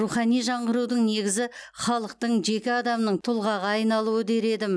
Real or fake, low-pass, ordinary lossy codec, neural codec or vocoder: real; none; none; none